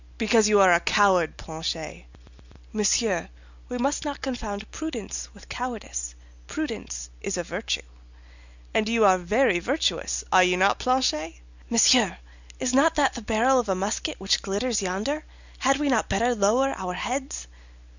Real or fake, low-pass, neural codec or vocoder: real; 7.2 kHz; none